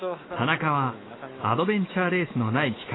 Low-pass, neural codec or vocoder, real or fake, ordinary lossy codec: 7.2 kHz; autoencoder, 48 kHz, 128 numbers a frame, DAC-VAE, trained on Japanese speech; fake; AAC, 16 kbps